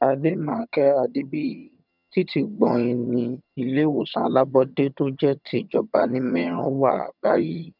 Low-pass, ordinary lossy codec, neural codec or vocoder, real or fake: 5.4 kHz; none; vocoder, 22.05 kHz, 80 mel bands, HiFi-GAN; fake